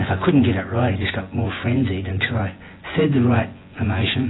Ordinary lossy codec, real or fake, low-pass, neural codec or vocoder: AAC, 16 kbps; fake; 7.2 kHz; vocoder, 24 kHz, 100 mel bands, Vocos